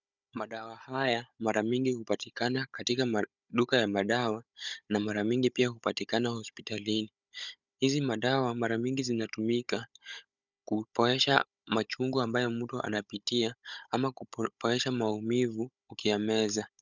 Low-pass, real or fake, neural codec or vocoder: 7.2 kHz; fake; codec, 16 kHz, 16 kbps, FunCodec, trained on Chinese and English, 50 frames a second